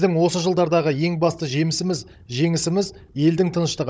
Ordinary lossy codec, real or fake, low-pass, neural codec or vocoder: none; fake; none; codec, 16 kHz, 16 kbps, FunCodec, trained on Chinese and English, 50 frames a second